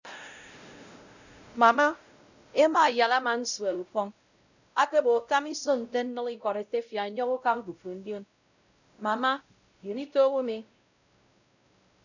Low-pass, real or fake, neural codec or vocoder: 7.2 kHz; fake; codec, 16 kHz, 0.5 kbps, X-Codec, WavLM features, trained on Multilingual LibriSpeech